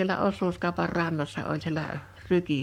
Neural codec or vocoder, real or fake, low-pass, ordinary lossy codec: codec, 44.1 kHz, 7.8 kbps, Pupu-Codec; fake; 19.8 kHz; MP3, 96 kbps